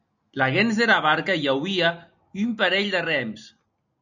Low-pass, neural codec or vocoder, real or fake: 7.2 kHz; none; real